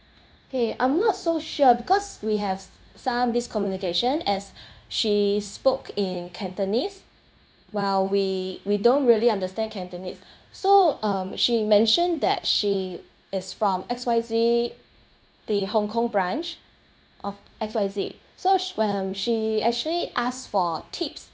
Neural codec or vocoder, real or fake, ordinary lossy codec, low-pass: codec, 16 kHz, 0.9 kbps, LongCat-Audio-Codec; fake; none; none